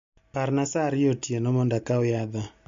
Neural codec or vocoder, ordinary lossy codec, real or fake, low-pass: none; MP3, 48 kbps; real; 7.2 kHz